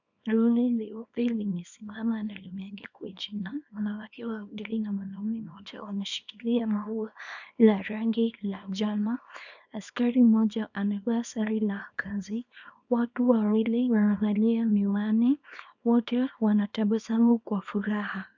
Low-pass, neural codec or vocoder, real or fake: 7.2 kHz; codec, 24 kHz, 0.9 kbps, WavTokenizer, small release; fake